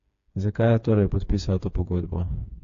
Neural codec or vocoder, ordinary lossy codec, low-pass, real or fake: codec, 16 kHz, 4 kbps, FreqCodec, smaller model; AAC, 48 kbps; 7.2 kHz; fake